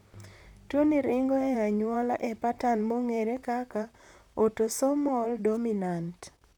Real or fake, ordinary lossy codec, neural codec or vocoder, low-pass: fake; none; vocoder, 44.1 kHz, 128 mel bands, Pupu-Vocoder; 19.8 kHz